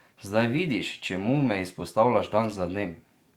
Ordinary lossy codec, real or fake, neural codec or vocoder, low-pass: Opus, 64 kbps; fake; codec, 44.1 kHz, 7.8 kbps, DAC; 19.8 kHz